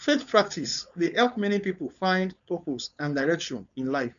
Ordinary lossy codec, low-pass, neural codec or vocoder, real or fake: none; 7.2 kHz; codec, 16 kHz, 4.8 kbps, FACodec; fake